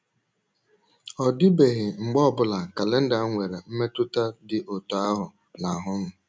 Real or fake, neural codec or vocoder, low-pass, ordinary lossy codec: real; none; none; none